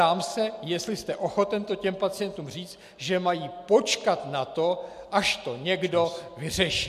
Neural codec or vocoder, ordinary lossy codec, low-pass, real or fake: none; MP3, 96 kbps; 14.4 kHz; real